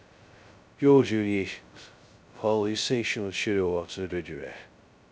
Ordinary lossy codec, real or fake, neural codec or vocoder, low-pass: none; fake; codec, 16 kHz, 0.2 kbps, FocalCodec; none